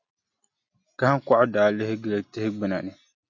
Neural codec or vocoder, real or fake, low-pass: none; real; 7.2 kHz